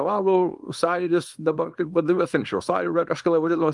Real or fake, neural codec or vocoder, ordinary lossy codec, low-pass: fake; codec, 24 kHz, 0.9 kbps, WavTokenizer, small release; Opus, 32 kbps; 10.8 kHz